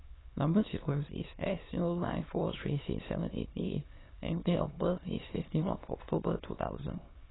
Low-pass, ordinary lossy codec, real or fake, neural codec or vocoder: 7.2 kHz; AAC, 16 kbps; fake; autoencoder, 22.05 kHz, a latent of 192 numbers a frame, VITS, trained on many speakers